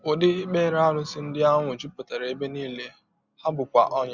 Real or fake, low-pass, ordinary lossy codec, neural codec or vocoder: real; 7.2 kHz; Opus, 64 kbps; none